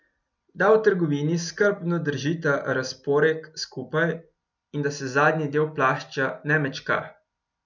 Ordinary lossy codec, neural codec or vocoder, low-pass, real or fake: none; none; 7.2 kHz; real